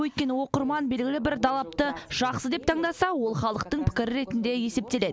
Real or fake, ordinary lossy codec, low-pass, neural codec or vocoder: real; none; none; none